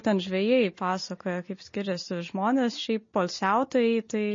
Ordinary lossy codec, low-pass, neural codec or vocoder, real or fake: MP3, 32 kbps; 7.2 kHz; none; real